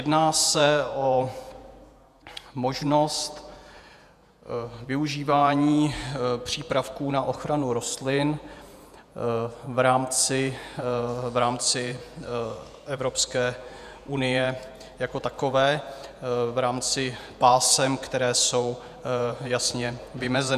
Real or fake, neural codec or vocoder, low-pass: fake; vocoder, 48 kHz, 128 mel bands, Vocos; 14.4 kHz